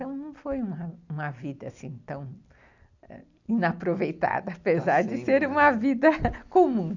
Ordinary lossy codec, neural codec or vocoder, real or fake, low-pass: none; none; real; 7.2 kHz